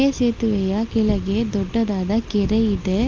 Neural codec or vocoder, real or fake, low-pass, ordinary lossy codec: none; real; 7.2 kHz; Opus, 24 kbps